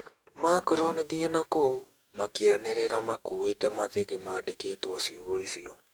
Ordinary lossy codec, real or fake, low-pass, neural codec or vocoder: none; fake; none; codec, 44.1 kHz, 2.6 kbps, DAC